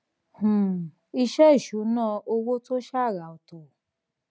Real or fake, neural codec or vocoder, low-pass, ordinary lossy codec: real; none; none; none